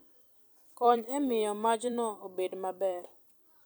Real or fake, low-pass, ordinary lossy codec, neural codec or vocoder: fake; none; none; vocoder, 44.1 kHz, 128 mel bands every 256 samples, BigVGAN v2